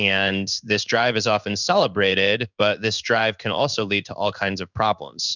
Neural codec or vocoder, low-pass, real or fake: codec, 16 kHz in and 24 kHz out, 1 kbps, XY-Tokenizer; 7.2 kHz; fake